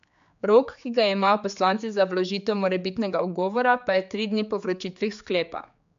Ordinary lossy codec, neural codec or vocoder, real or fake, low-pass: MP3, 64 kbps; codec, 16 kHz, 4 kbps, X-Codec, HuBERT features, trained on general audio; fake; 7.2 kHz